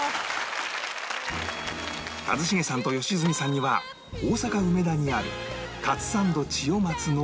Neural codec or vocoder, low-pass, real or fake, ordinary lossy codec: none; none; real; none